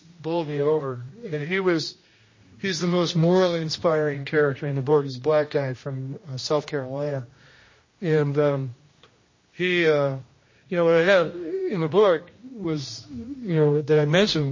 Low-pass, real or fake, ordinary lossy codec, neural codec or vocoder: 7.2 kHz; fake; MP3, 32 kbps; codec, 16 kHz, 1 kbps, X-Codec, HuBERT features, trained on general audio